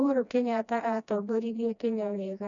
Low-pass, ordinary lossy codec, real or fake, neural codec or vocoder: 7.2 kHz; none; fake; codec, 16 kHz, 1 kbps, FreqCodec, smaller model